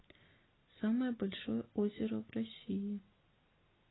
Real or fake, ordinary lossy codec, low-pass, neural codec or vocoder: real; AAC, 16 kbps; 7.2 kHz; none